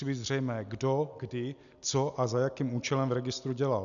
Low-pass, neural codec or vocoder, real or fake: 7.2 kHz; none; real